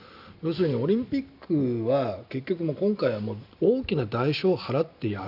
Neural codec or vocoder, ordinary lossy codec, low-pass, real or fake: none; none; 5.4 kHz; real